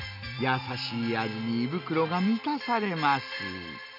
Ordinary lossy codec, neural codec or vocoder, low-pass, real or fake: none; none; 5.4 kHz; real